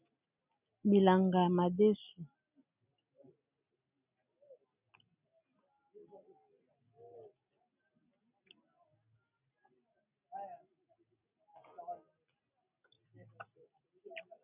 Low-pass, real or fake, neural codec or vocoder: 3.6 kHz; real; none